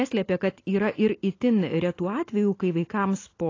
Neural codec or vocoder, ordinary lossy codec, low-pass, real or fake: none; AAC, 32 kbps; 7.2 kHz; real